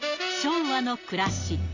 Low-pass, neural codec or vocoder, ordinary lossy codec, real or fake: 7.2 kHz; none; AAC, 48 kbps; real